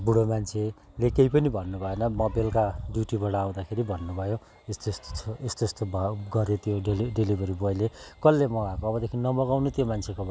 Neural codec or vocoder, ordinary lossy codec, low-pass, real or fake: none; none; none; real